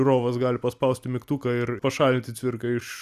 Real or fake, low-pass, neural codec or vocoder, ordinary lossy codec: real; 14.4 kHz; none; Opus, 64 kbps